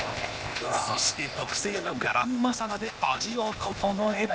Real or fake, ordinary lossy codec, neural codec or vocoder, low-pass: fake; none; codec, 16 kHz, 0.8 kbps, ZipCodec; none